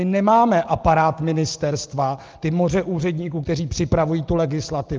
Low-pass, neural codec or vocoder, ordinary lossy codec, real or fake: 7.2 kHz; none; Opus, 32 kbps; real